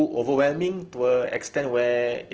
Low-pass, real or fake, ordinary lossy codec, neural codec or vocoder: 7.2 kHz; real; Opus, 16 kbps; none